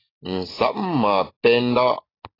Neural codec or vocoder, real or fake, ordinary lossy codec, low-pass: none; real; AAC, 24 kbps; 5.4 kHz